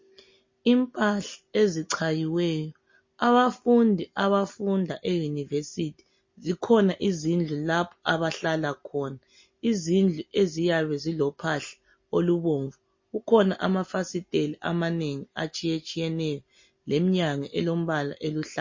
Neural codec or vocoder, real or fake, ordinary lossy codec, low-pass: none; real; MP3, 32 kbps; 7.2 kHz